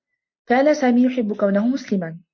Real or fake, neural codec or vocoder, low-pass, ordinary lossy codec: real; none; 7.2 kHz; MP3, 48 kbps